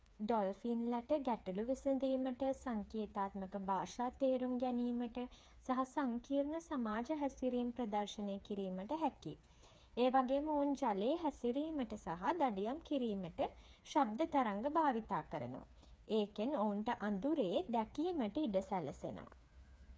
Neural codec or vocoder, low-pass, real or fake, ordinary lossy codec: codec, 16 kHz, 4 kbps, FreqCodec, smaller model; none; fake; none